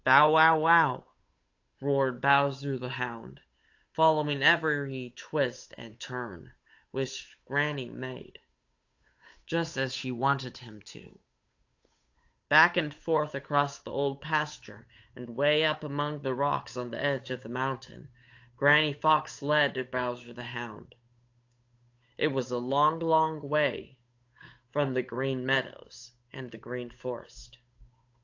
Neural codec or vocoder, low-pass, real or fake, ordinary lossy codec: codec, 16 kHz, 8 kbps, FunCodec, trained on Chinese and English, 25 frames a second; 7.2 kHz; fake; AAC, 48 kbps